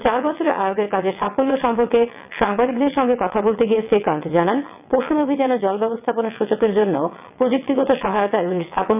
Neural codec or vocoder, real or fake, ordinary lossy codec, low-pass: vocoder, 22.05 kHz, 80 mel bands, WaveNeXt; fake; none; 3.6 kHz